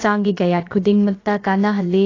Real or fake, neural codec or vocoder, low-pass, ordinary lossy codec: fake; codec, 16 kHz, about 1 kbps, DyCAST, with the encoder's durations; 7.2 kHz; AAC, 32 kbps